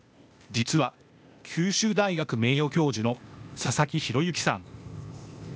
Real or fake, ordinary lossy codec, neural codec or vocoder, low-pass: fake; none; codec, 16 kHz, 0.8 kbps, ZipCodec; none